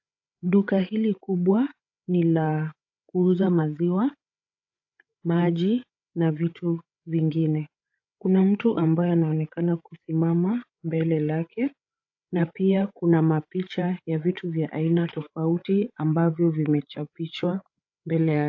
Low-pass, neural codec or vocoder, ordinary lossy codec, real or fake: 7.2 kHz; codec, 16 kHz, 8 kbps, FreqCodec, larger model; AAC, 48 kbps; fake